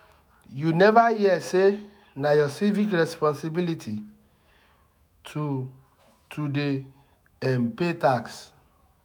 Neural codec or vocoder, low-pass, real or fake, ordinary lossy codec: autoencoder, 48 kHz, 128 numbers a frame, DAC-VAE, trained on Japanese speech; none; fake; none